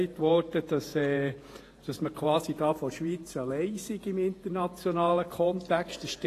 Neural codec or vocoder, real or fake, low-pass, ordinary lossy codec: vocoder, 48 kHz, 128 mel bands, Vocos; fake; 14.4 kHz; AAC, 48 kbps